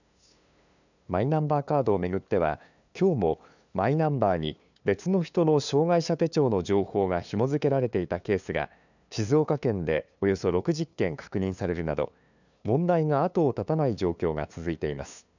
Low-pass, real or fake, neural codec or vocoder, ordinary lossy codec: 7.2 kHz; fake; codec, 16 kHz, 2 kbps, FunCodec, trained on LibriTTS, 25 frames a second; none